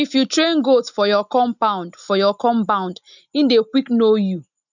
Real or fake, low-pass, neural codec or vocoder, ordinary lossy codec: real; 7.2 kHz; none; none